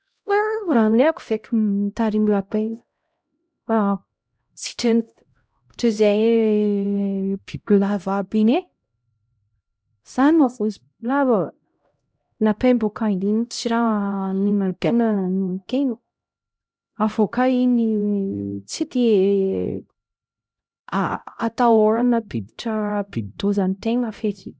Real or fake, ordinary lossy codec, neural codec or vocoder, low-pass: fake; none; codec, 16 kHz, 0.5 kbps, X-Codec, HuBERT features, trained on LibriSpeech; none